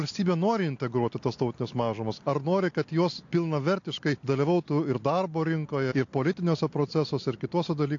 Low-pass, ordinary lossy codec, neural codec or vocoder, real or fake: 7.2 kHz; AAC, 48 kbps; none; real